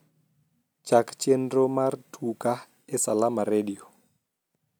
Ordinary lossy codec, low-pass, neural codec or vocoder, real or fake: none; none; none; real